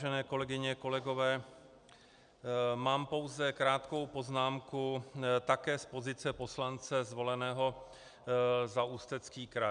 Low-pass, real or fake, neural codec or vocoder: 9.9 kHz; real; none